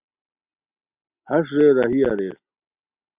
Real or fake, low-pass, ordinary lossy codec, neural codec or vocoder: real; 3.6 kHz; Opus, 64 kbps; none